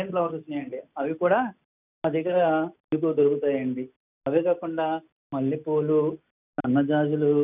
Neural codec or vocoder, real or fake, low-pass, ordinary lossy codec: none; real; 3.6 kHz; none